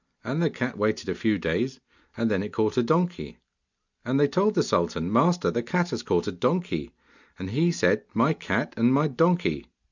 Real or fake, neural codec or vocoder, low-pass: real; none; 7.2 kHz